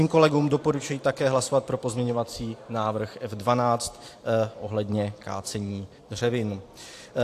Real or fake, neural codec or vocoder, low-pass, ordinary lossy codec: fake; vocoder, 44.1 kHz, 128 mel bands every 512 samples, BigVGAN v2; 14.4 kHz; AAC, 64 kbps